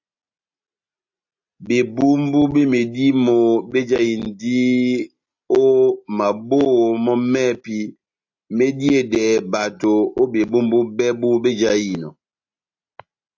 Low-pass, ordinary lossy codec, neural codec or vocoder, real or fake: 7.2 kHz; AAC, 48 kbps; none; real